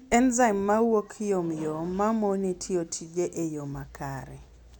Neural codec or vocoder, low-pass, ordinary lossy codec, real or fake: none; 19.8 kHz; none; real